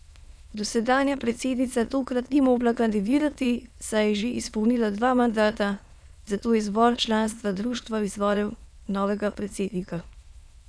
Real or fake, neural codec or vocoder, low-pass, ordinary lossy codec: fake; autoencoder, 22.05 kHz, a latent of 192 numbers a frame, VITS, trained on many speakers; none; none